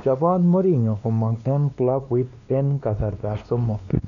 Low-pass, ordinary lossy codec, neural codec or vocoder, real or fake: 7.2 kHz; none; codec, 16 kHz, 2 kbps, X-Codec, WavLM features, trained on Multilingual LibriSpeech; fake